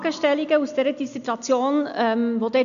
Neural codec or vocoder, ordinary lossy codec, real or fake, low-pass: none; none; real; 7.2 kHz